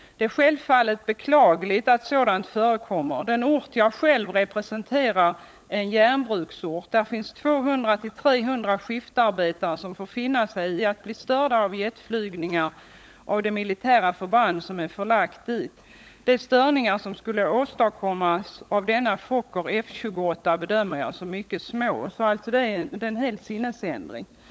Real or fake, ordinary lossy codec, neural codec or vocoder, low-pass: fake; none; codec, 16 kHz, 16 kbps, FunCodec, trained on LibriTTS, 50 frames a second; none